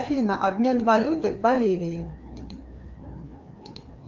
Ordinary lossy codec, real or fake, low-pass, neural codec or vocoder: Opus, 24 kbps; fake; 7.2 kHz; codec, 16 kHz, 2 kbps, FreqCodec, larger model